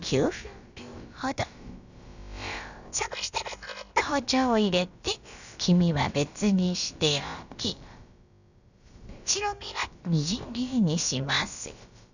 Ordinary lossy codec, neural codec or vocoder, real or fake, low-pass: none; codec, 16 kHz, about 1 kbps, DyCAST, with the encoder's durations; fake; 7.2 kHz